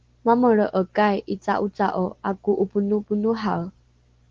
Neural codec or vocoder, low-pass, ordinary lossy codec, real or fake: none; 7.2 kHz; Opus, 16 kbps; real